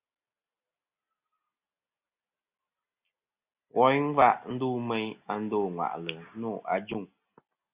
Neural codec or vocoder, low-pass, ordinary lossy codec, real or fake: none; 3.6 kHz; Opus, 64 kbps; real